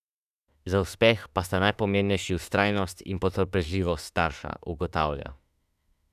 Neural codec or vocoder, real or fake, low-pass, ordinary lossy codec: autoencoder, 48 kHz, 32 numbers a frame, DAC-VAE, trained on Japanese speech; fake; 14.4 kHz; none